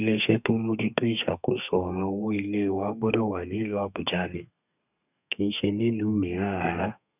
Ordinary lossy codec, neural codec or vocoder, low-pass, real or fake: none; codec, 32 kHz, 1.9 kbps, SNAC; 3.6 kHz; fake